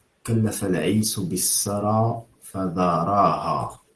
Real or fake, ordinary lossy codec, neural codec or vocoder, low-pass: real; Opus, 16 kbps; none; 10.8 kHz